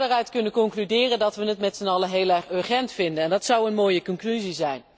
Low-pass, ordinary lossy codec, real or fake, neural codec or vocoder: none; none; real; none